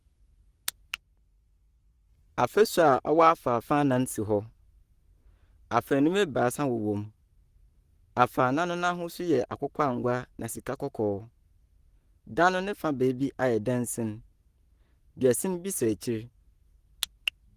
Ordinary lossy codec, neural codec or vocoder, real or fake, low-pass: Opus, 24 kbps; codec, 44.1 kHz, 7.8 kbps, Pupu-Codec; fake; 14.4 kHz